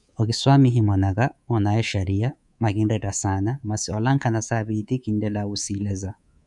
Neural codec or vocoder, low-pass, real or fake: codec, 24 kHz, 3.1 kbps, DualCodec; 10.8 kHz; fake